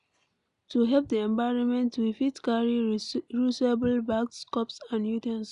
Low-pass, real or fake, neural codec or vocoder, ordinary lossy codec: 10.8 kHz; real; none; none